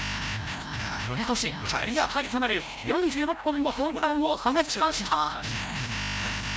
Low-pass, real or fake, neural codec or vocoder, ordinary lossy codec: none; fake; codec, 16 kHz, 0.5 kbps, FreqCodec, larger model; none